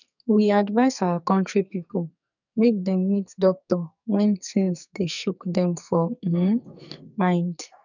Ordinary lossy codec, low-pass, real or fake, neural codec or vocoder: none; 7.2 kHz; fake; codec, 44.1 kHz, 2.6 kbps, SNAC